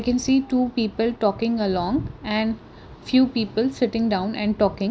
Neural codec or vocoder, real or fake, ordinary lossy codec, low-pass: none; real; none; none